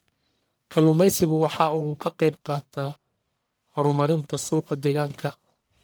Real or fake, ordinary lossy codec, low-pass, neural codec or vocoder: fake; none; none; codec, 44.1 kHz, 1.7 kbps, Pupu-Codec